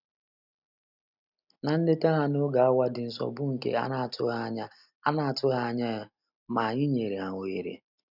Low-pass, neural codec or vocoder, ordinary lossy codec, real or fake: 5.4 kHz; none; none; real